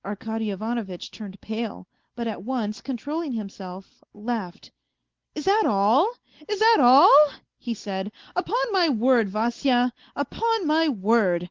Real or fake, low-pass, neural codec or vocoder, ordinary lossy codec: real; 7.2 kHz; none; Opus, 16 kbps